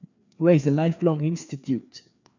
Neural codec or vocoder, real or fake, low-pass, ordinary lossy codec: codec, 16 kHz, 2 kbps, FreqCodec, larger model; fake; 7.2 kHz; none